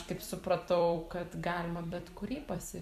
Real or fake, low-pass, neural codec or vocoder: fake; 14.4 kHz; vocoder, 44.1 kHz, 128 mel bands every 256 samples, BigVGAN v2